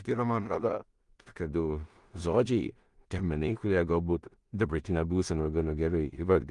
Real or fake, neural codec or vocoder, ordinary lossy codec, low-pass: fake; codec, 16 kHz in and 24 kHz out, 0.4 kbps, LongCat-Audio-Codec, two codebook decoder; Opus, 32 kbps; 10.8 kHz